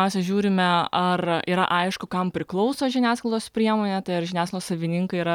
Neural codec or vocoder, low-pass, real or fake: none; 19.8 kHz; real